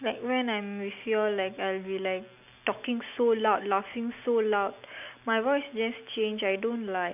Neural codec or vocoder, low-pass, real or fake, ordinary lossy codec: none; 3.6 kHz; real; none